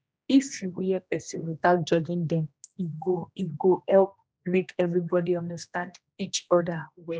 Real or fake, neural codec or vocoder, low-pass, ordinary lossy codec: fake; codec, 16 kHz, 1 kbps, X-Codec, HuBERT features, trained on general audio; none; none